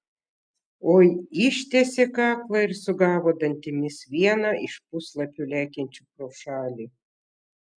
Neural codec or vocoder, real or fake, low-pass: none; real; 9.9 kHz